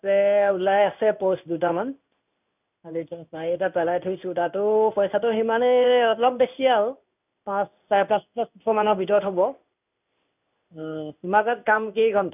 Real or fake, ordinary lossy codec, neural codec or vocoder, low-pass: fake; none; codec, 16 kHz in and 24 kHz out, 1 kbps, XY-Tokenizer; 3.6 kHz